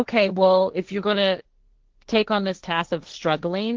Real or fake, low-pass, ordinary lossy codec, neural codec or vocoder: fake; 7.2 kHz; Opus, 16 kbps; codec, 16 kHz, 1.1 kbps, Voila-Tokenizer